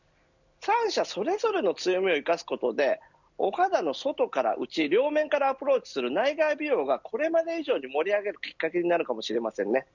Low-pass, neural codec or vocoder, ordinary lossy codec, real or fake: 7.2 kHz; none; none; real